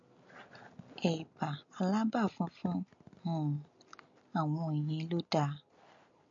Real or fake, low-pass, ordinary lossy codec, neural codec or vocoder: real; 7.2 kHz; MP3, 48 kbps; none